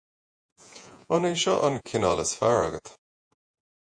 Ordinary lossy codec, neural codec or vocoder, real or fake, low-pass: AAC, 64 kbps; vocoder, 48 kHz, 128 mel bands, Vocos; fake; 9.9 kHz